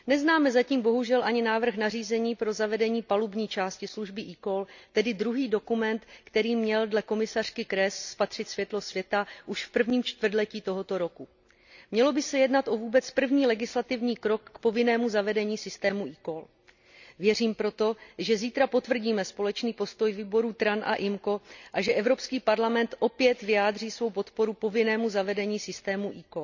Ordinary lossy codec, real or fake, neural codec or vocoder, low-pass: none; real; none; 7.2 kHz